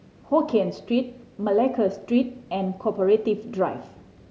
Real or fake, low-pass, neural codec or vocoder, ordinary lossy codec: real; none; none; none